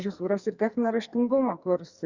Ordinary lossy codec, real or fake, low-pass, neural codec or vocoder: Opus, 64 kbps; fake; 7.2 kHz; codec, 44.1 kHz, 2.6 kbps, SNAC